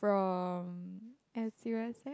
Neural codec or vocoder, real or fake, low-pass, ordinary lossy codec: none; real; none; none